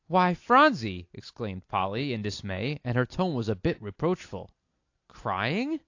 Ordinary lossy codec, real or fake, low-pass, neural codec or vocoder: AAC, 48 kbps; real; 7.2 kHz; none